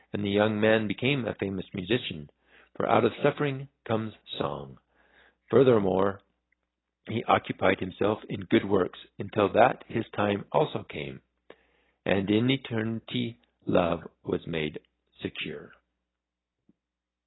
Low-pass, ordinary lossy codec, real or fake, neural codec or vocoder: 7.2 kHz; AAC, 16 kbps; real; none